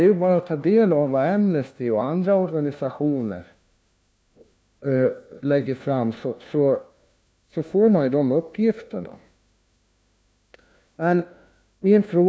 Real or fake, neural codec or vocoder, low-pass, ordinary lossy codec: fake; codec, 16 kHz, 1 kbps, FunCodec, trained on LibriTTS, 50 frames a second; none; none